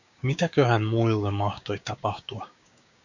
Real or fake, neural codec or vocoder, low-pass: fake; codec, 16 kHz, 6 kbps, DAC; 7.2 kHz